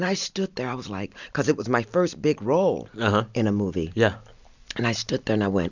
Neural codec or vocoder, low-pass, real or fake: none; 7.2 kHz; real